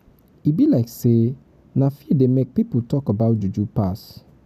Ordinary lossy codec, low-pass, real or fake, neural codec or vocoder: none; 14.4 kHz; real; none